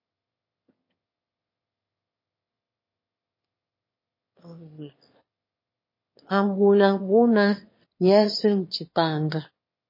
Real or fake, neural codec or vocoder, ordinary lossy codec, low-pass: fake; autoencoder, 22.05 kHz, a latent of 192 numbers a frame, VITS, trained on one speaker; MP3, 24 kbps; 5.4 kHz